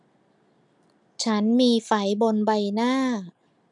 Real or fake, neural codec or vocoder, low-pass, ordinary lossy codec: real; none; 10.8 kHz; none